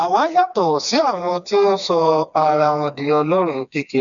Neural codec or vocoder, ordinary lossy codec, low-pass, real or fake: codec, 16 kHz, 2 kbps, FreqCodec, smaller model; none; 7.2 kHz; fake